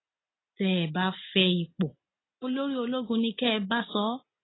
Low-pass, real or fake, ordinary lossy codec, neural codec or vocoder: 7.2 kHz; real; AAC, 16 kbps; none